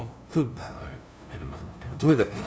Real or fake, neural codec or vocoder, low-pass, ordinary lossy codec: fake; codec, 16 kHz, 0.5 kbps, FunCodec, trained on LibriTTS, 25 frames a second; none; none